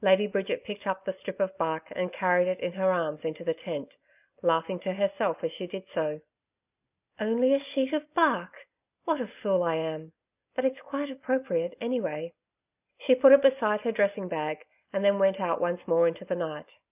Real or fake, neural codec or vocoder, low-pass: real; none; 3.6 kHz